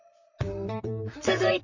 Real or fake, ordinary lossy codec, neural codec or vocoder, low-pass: fake; none; vocoder, 44.1 kHz, 128 mel bands, Pupu-Vocoder; 7.2 kHz